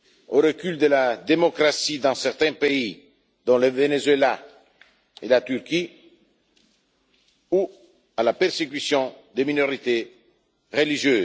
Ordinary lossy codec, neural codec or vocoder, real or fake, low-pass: none; none; real; none